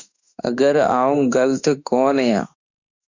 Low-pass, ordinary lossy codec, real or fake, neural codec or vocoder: 7.2 kHz; Opus, 64 kbps; fake; autoencoder, 48 kHz, 32 numbers a frame, DAC-VAE, trained on Japanese speech